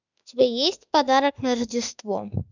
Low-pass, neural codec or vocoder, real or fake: 7.2 kHz; autoencoder, 48 kHz, 32 numbers a frame, DAC-VAE, trained on Japanese speech; fake